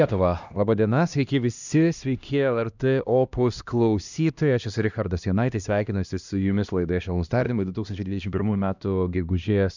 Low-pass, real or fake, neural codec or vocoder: 7.2 kHz; fake; codec, 16 kHz, 1 kbps, X-Codec, HuBERT features, trained on LibriSpeech